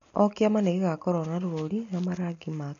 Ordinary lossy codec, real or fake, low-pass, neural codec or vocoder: none; real; 7.2 kHz; none